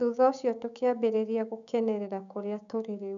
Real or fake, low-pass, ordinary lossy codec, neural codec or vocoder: fake; 7.2 kHz; MP3, 96 kbps; codec, 16 kHz, 6 kbps, DAC